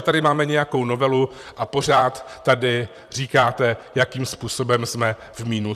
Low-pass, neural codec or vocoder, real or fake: 14.4 kHz; vocoder, 44.1 kHz, 128 mel bands, Pupu-Vocoder; fake